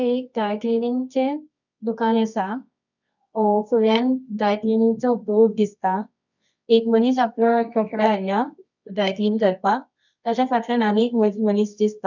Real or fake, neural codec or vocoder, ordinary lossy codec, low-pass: fake; codec, 24 kHz, 0.9 kbps, WavTokenizer, medium music audio release; none; 7.2 kHz